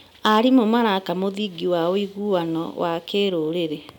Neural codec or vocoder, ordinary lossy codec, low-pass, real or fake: none; none; 19.8 kHz; real